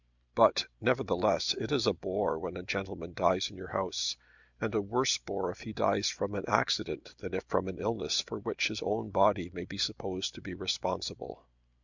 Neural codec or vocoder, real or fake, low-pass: none; real; 7.2 kHz